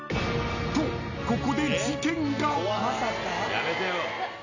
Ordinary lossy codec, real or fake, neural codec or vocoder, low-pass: none; real; none; 7.2 kHz